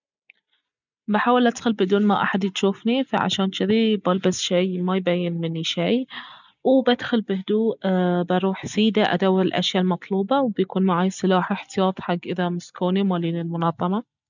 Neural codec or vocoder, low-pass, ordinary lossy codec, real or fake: none; 7.2 kHz; none; real